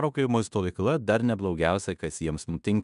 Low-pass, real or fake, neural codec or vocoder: 10.8 kHz; fake; codec, 16 kHz in and 24 kHz out, 0.9 kbps, LongCat-Audio-Codec, fine tuned four codebook decoder